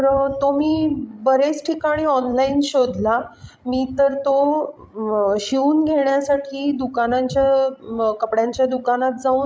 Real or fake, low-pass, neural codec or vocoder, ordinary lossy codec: fake; none; codec, 16 kHz, 16 kbps, FreqCodec, larger model; none